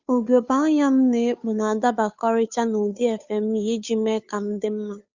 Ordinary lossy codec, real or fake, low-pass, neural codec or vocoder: Opus, 64 kbps; fake; 7.2 kHz; codec, 16 kHz, 2 kbps, FunCodec, trained on Chinese and English, 25 frames a second